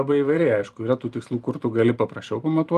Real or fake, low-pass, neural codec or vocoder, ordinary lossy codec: fake; 14.4 kHz; vocoder, 44.1 kHz, 128 mel bands every 256 samples, BigVGAN v2; Opus, 32 kbps